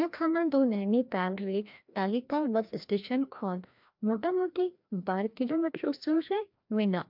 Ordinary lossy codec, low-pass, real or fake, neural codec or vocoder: MP3, 48 kbps; 5.4 kHz; fake; codec, 16 kHz, 1 kbps, FreqCodec, larger model